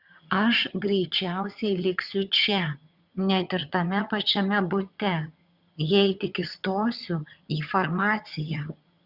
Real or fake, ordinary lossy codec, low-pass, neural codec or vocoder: fake; Opus, 64 kbps; 5.4 kHz; vocoder, 22.05 kHz, 80 mel bands, HiFi-GAN